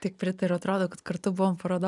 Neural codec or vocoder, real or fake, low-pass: none; real; 10.8 kHz